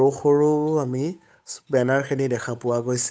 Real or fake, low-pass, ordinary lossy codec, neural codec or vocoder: fake; none; none; codec, 16 kHz, 8 kbps, FunCodec, trained on Chinese and English, 25 frames a second